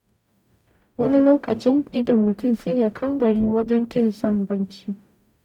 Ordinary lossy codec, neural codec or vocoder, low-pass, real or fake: none; codec, 44.1 kHz, 0.9 kbps, DAC; 19.8 kHz; fake